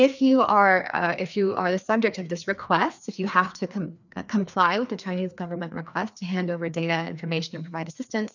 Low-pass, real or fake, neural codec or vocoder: 7.2 kHz; fake; codec, 16 kHz, 2 kbps, FreqCodec, larger model